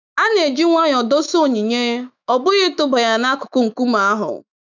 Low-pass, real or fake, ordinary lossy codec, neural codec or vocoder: 7.2 kHz; fake; none; autoencoder, 48 kHz, 128 numbers a frame, DAC-VAE, trained on Japanese speech